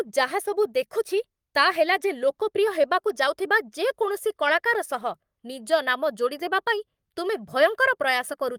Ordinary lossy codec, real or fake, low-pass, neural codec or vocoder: Opus, 32 kbps; fake; 19.8 kHz; codec, 44.1 kHz, 7.8 kbps, Pupu-Codec